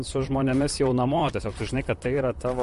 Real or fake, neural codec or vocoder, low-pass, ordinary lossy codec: fake; vocoder, 44.1 kHz, 128 mel bands, Pupu-Vocoder; 14.4 kHz; MP3, 48 kbps